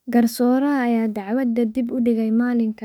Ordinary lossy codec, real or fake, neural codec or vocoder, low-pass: none; fake; autoencoder, 48 kHz, 32 numbers a frame, DAC-VAE, trained on Japanese speech; 19.8 kHz